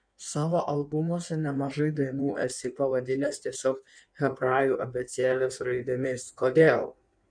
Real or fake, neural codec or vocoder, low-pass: fake; codec, 16 kHz in and 24 kHz out, 1.1 kbps, FireRedTTS-2 codec; 9.9 kHz